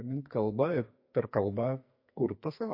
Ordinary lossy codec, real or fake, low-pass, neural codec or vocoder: MP3, 32 kbps; fake; 5.4 kHz; codec, 44.1 kHz, 2.6 kbps, SNAC